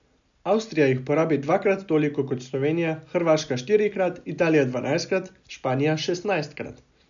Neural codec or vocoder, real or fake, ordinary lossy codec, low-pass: none; real; none; 7.2 kHz